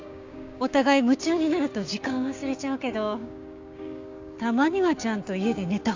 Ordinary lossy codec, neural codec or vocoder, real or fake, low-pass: AAC, 48 kbps; codec, 16 kHz, 6 kbps, DAC; fake; 7.2 kHz